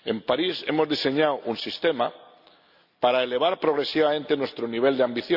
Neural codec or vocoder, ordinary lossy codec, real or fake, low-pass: none; Opus, 64 kbps; real; 5.4 kHz